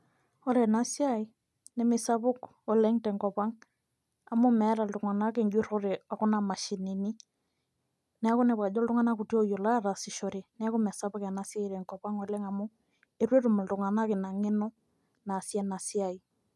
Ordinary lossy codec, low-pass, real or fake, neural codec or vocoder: none; none; real; none